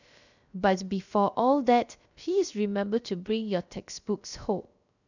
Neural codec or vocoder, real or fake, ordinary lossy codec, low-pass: codec, 16 kHz, 0.3 kbps, FocalCodec; fake; none; 7.2 kHz